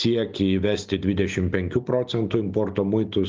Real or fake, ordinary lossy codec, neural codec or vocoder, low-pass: real; Opus, 32 kbps; none; 7.2 kHz